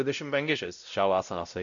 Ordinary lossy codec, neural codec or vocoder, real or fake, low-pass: MP3, 96 kbps; codec, 16 kHz, 0.5 kbps, X-Codec, WavLM features, trained on Multilingual LibriSpeech; fake; 7.2 kHz